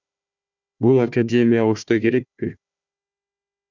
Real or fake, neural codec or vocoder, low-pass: fake; codec, 16 kHz, 1 kbps, FunCodec, trained on Chinese and English, 50 frames a second; 7.2 kHz